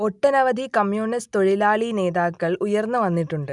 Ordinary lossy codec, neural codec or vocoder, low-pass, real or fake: none; none; 10.8 kHz; real